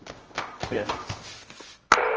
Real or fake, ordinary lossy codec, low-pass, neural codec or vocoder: fake; Opus, 24 kbps; 7.2 kHz; codec, 24 kHz, 0.9 kbps, WavTokenizer, medium music audio release